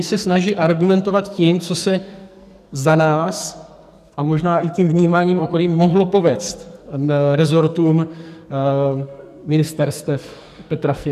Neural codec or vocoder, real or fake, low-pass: codec, 44.1 kHz, 2.6 kbps, SNAC; fake; 14.4 kHz